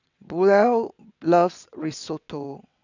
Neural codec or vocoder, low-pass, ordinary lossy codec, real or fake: vocoder, 22.05 kHz, 80 mel bands, WaveNeXt; 7.2 kHz; none; fake